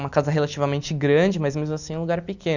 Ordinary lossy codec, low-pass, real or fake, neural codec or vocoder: none; 7.2 kHz; real; none